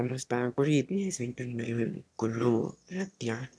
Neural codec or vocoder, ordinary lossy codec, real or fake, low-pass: autoencoder, 22.05 kHz, a latent of 192 numbers a frame, VITS, trained on one speaker; none; fake; none